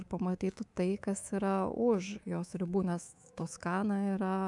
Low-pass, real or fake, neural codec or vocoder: 10.8 kHz; fake; codec, 24 kHz, 3.1 kbps, DualCodec